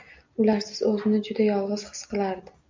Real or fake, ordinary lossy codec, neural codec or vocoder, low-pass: real; MP3, 64 kbps; none; 7.2 kHz